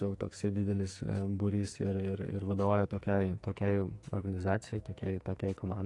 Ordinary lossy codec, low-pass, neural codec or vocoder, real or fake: AAC, 48 kbps; 10.8 kHz; codec, 44.1 kHz, 2.6 kbps, SNAC; fake